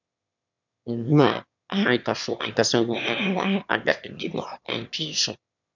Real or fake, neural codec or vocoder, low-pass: fake; autoencoder, 22.05 kHz, a latent of 192 numbers a frame, VITS, trained on one speaker; 7.2 kHz